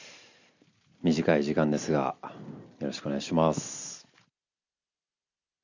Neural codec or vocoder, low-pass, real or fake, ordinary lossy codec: none; 7.2 kHz; real; none